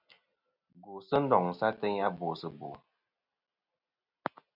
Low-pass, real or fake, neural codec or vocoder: 5.4 kHz; real; none